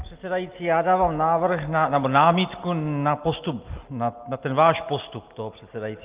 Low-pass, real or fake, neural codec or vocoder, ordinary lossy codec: 3.6 kHz; real; none; Opus, 32 kbps